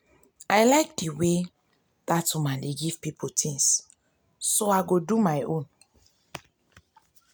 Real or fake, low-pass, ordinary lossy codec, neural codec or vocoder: real; none; none; none